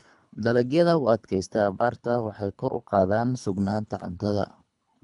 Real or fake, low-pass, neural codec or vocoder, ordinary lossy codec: fake; 10.8 kHz; codec, 24 kHz, 3 kbps, HILCodec; none